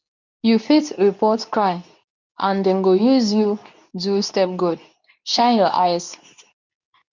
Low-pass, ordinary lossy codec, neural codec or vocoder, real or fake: 7.2 kHz; none; codec, 24 kHz, 0.9 kbps, WavTokenizer, medium speech release version 2; fake